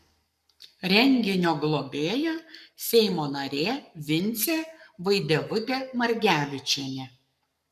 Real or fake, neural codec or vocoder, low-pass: fake; codec, 44.1 kHz, 7.8 kbps, Pupu-Codec; 14.4 kHz